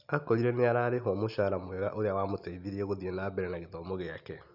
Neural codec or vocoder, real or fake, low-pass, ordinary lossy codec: none; real; 5.4 kHz; none